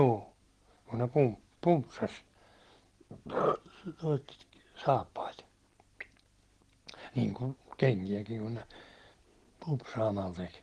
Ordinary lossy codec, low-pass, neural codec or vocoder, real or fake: Opus, 16 kbps; 10.8 kHz; none; real